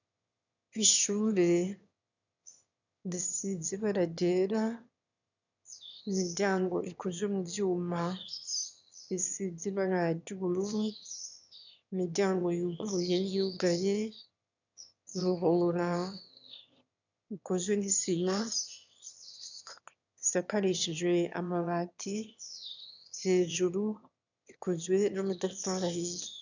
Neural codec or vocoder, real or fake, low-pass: autoencoder, 22.05 kHz, a latent of 192 numbers a frame, VITS, trained on one speaker; fake; 7.2 kHz